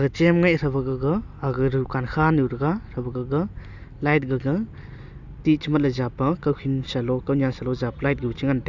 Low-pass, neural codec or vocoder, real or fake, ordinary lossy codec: 7.2 kHz; none; real; none